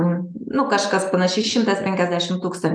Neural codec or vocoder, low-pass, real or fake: none; 9.9 kHz; real